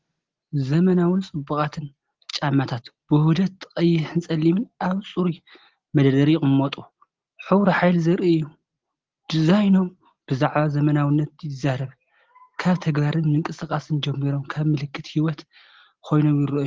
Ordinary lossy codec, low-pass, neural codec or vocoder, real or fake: Opus, 16 kbps; 7.2 kHz; none; real